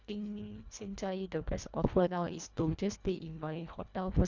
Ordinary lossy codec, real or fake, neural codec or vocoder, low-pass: none; fake; codec, 24 kHz, 1.5 kbps, HILCodec; 7.2 kHz